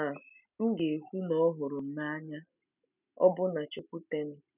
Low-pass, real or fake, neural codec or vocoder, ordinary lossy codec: 3.6 kHz; real; none; none